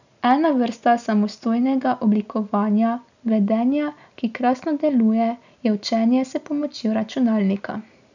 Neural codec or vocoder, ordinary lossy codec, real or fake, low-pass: none; none; real; 7.2 kHz